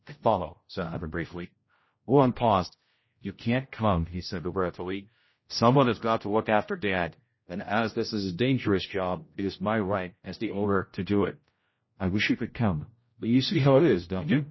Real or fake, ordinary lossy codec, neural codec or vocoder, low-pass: fake; MP3, 24 kbps; codec, 16 kHz, 0.5 kbps, X-Codec, HuBERT features, trained on general audio; 7.2 kHz